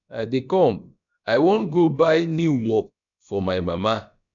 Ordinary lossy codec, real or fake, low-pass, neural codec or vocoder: none; fake; 7.2 kHz; codec, 16 kHz, about 1 kbps, DyCAST, with the encoder's durations